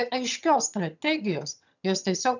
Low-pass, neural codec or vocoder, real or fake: 7.2 kHz; vocoder, 22.05 kHz, 80 mel bands, HiFi-GAN; fake